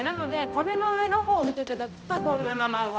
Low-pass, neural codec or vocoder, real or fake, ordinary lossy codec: none; codec, 16 kHz, 0.5 kbps, X-Codec, HuBERT features, trained on balanced general audio; fake; none